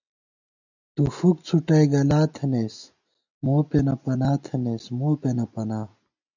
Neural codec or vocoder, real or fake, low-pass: none; real; 7.2 kHz